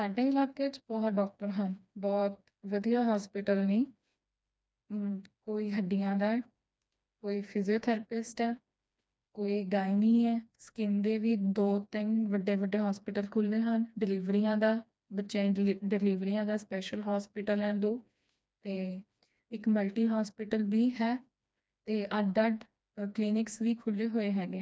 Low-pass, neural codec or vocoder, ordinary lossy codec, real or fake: none; codec, 16 kHz, 2 kbps, FreqCodec, smaller model; none; fake